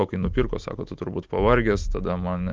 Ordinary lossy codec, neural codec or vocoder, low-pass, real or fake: Opus, 32 kbps; none; 7.2 kHz; real